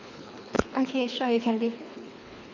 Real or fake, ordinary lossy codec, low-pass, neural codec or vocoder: fake; none; 7.2 kHz; codec, 24 kHz, 3 kbps, HILCodec